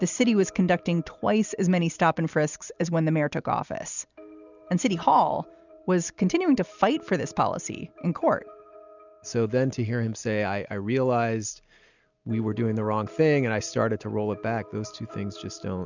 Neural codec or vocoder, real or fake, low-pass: none; real; 7.2 kHz